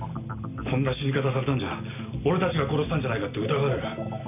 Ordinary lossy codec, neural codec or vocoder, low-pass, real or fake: none; none; 3.6 kHz; real